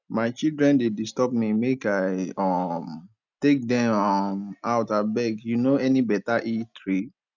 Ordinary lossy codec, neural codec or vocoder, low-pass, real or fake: none; none; 7.2 kHz; real